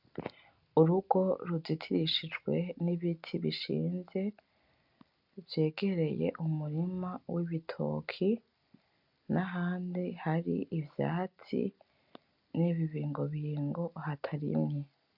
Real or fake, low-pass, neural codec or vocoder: real; 5.4 kHz; none